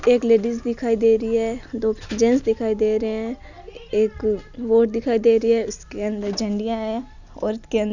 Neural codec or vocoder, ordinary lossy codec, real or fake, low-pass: none; none; real; 7.2 kHz